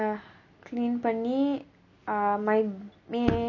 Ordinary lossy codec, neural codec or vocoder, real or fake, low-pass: none; none; real; 7.2 kHz